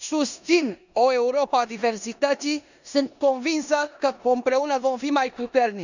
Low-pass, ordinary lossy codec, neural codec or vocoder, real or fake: 7.2 kHz; none; codec, 16 kHz in and 24 kHz out, 0.9 kbps, LongCat-Audio-Codec, four codebook decoder; fake